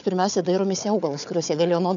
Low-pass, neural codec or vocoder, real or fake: 7.2 kHz; codec, 16 kHz, 4 kbps, FunCodec, trained on Chinese and English, 50 frames a second; fake